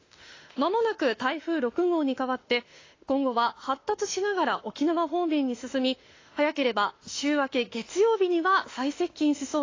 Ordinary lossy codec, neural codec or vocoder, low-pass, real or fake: AAC, 32 kbps; autoencoder, 48 kHz, 32 numbers a frame, DAC-VAE, trained on Japanese speech; 7.2 kHz; fake